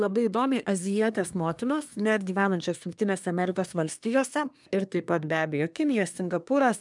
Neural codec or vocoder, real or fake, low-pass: codec, 24 kHz, 1 kbps, SNAC; fake; 10.8 kHz